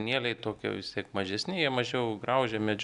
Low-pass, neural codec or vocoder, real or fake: 10.8 kHz; none; real